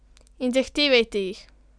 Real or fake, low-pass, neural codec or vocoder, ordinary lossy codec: real; 9.9 kHz; none; none